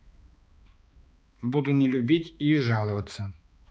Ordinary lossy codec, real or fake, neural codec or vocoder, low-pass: none; fake; codec, 16 kHz, 2 kbps, X-Codec, HuBERT features, trained on balanced general audio; none